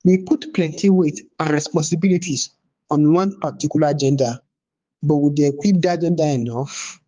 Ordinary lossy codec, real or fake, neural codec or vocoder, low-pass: Opus, 32 kbps; fake; codec, 16 kHz, 4 kbps, X-Codec, HuBERT features, trained on balanced general audio; 7.2 kHz